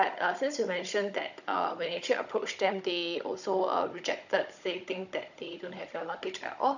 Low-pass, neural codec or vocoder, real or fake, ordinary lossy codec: 7.2 kHz; codec, 16 kHz, 16 kbps, FunCodec, trained on Chinese and English, 50 frames a second; fake; none